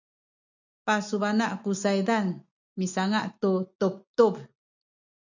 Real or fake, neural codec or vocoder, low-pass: real; none; 7.2 kHz